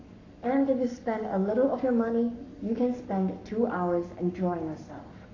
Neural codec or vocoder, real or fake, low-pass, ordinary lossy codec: codec, 44.1 kHz, 7.8 kbps, Pupu-Codec; fake; 7.2 kHz; AAC, 48 kbps